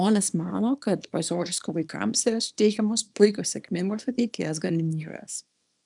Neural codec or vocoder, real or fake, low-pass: codec, 24 kHz, 0.9 kbps, WavTokenizer, small release; fake; 10.8 kHz